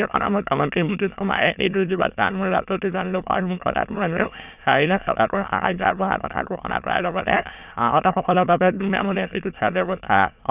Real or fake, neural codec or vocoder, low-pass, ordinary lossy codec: fake; autoencoder, 22.05 kHz, a latent of 192 numbers a frame, VITS, trained on many speakers; 3.6 kHz; none